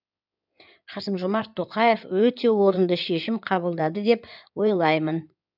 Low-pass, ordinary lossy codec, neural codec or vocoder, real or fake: 5.4 kHz; none; codec, 16 kHz in and 24 kHz out, 2.2 kbps, FireRedTTS-2 codec; fake